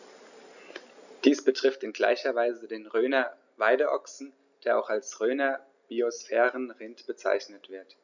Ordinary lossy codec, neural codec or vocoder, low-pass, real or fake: none; none; 7.2 kHz; real